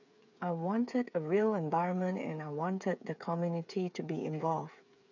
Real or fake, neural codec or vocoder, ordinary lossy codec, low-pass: fake; codec, 16 kHz, 8 kbps, FreqCodec, smaller model; none; 7.2 kHz